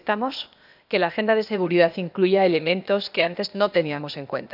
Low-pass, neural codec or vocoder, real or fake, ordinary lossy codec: 5.4 kHz; codec, 16 kHz, 0.8 kbps, ZipCodec; fake; none